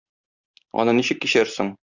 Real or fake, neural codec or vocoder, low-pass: real; none; 7.2 kHz